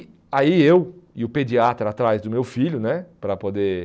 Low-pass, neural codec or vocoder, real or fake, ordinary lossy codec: none; none; real; none